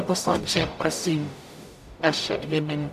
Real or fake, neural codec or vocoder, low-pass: fake; codec, 44.1 kHz, 0.9 kbps, DAC; 14.4 kHz